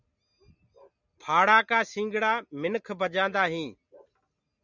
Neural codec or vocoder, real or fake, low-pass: none; real; 7.2 kHz